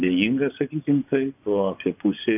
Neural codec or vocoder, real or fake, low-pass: vocoder, 24 kHz, 100 mel bands, Vocos; fake; 3.6 kHz